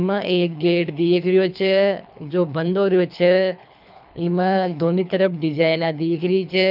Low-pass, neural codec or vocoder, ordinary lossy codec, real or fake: 5.4 kHz; codec, 24 kHz, 3 kbps, HILCodec; none; fake